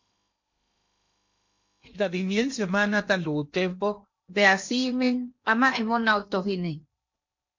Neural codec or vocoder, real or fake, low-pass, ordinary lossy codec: codec, 16 kHz in and 24 kHz out, 0.8 kbps, FocalCodec, streaming, 65536 codes; fake; 7.2 kHz; MP3, 48 kbps